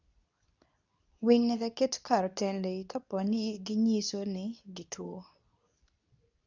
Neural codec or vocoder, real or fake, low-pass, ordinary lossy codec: codec, 24 kHz, 0.9 kbps, WavTokenizer, medium speech release version 2; fake; 7.2 kHz; none